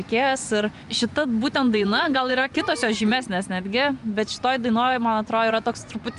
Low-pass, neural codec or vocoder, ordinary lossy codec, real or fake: 10.8 kHz; vocoder, 24 kHz, 100 mel bands, Vocos; MP3, 96 kbps; fake